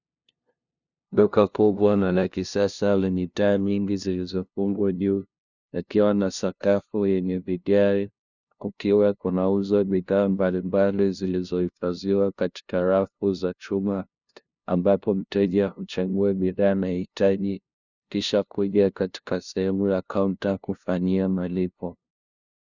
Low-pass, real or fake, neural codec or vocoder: 7.2 kHz; fake; codec, 16 kHz, 0.5 kbps, FunCodec, trained on LibriTTS, 25 frames a second